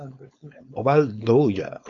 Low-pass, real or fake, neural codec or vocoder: 7.2 kHz; fake; codec, 16 kHz, 4.8 kbps, FACodec